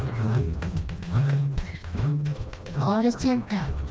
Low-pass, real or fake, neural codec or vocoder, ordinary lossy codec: none; fake; codec, 16 kHz, 1 kbps, FreqCodec, smaller model; none